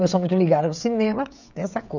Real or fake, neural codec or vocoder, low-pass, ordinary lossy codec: fake; codec, 16 kHz, 16 kbps, FreqCodec, smaller model; 7.2 kHz; none